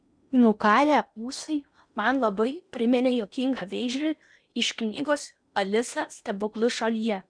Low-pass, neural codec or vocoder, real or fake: 9.9 kHz; codec, 16 kHz in and 24 kHz out, 0.8 kbps, FocalCodec, streaming, 65536 codes; fake